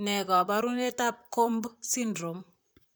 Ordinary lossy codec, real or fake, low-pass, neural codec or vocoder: none; fake; none; vocoder, 44.1 kHz, 128 mel bands, Pupu-Vocoder